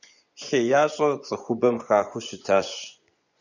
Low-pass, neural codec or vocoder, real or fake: 7.2 kHz; vocoder, 24 kHz, 100 mel bands, Vocos; fake